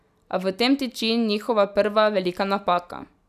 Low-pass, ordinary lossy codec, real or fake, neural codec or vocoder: 14.4 kHz; none; real; none